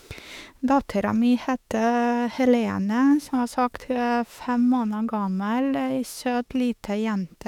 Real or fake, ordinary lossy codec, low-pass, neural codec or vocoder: fake; none; 19.8 kHz; autoencoder, 48 kHz, 32 numbers a frame, DAC-VAE, trained on Japanese speech